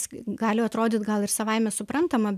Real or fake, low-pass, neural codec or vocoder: real; 14.4 kHz; none